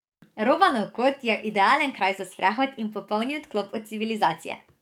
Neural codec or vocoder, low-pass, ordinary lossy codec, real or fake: codec, 44.1 kHz, 7.8 kbps, DAC; 19.8 kHz; none; fake